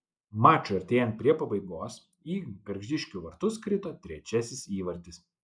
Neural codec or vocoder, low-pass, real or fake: none; 9.9 kHz; real